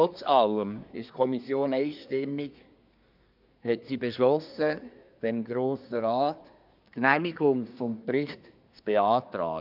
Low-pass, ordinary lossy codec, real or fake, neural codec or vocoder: 5.4 kHz; none; fake; codec, 24 kHz, 1 kbps, SNAC